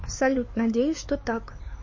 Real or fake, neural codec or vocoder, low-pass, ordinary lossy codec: fake; codec, 16 kHz, 4 kbps, X-Codec, HuBERT features, trained on LibriSpeech; 7.2 kHz; MP3, 32 kbps